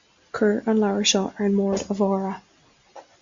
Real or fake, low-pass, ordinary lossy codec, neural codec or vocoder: real; 7.2 kHz; Opus, 64 kbps; none